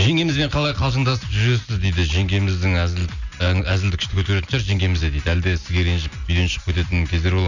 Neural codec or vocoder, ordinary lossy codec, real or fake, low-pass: none; none; real; 7.2 kHz